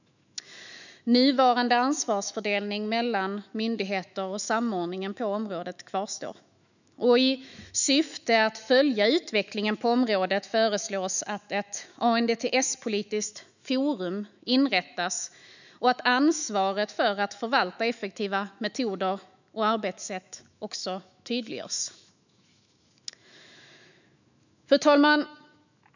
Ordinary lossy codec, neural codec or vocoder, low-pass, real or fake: none; autoencoder, 48 kHz, 128 numbers a frame, DAC-VAE, trained on Japanese speech; 7.2 kHz; fake